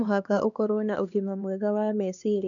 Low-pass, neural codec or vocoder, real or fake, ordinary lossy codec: 7.2 kHz; codec, 16 kHz, 2 kbps, X-Codec, HuBERT features, trained on LibriSpeech; fake; none